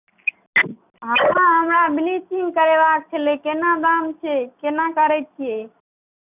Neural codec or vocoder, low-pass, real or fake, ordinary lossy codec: none; 3.6 kHz; real; none